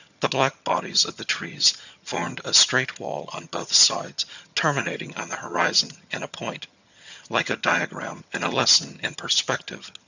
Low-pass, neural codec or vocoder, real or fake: 7.2 kHz; vocoder, 22.05 kHz, 80 mel bands, HiFi-GAN; fake